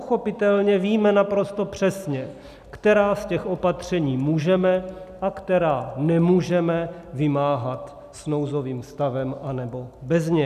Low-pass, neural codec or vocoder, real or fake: 14.4 kHz; none; real